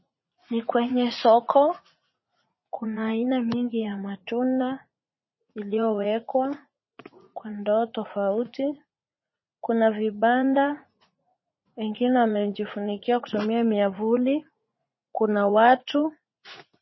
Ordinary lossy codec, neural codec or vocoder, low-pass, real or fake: MP3, 24 kbps; vocoder, 44.1 kHz, 80 mel bands, Vocos; 7.2 kHz; fake